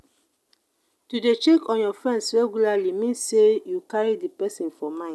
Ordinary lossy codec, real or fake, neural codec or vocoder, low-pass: none; real; none; none